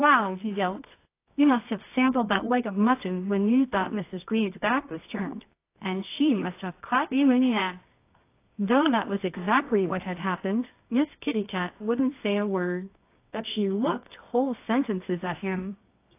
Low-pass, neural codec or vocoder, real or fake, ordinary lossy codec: 3.6 kHz; codec, 24 kHz, 0.9 kbps, WavTokenizer, medium music audio release; fake; AAC, 24 kbps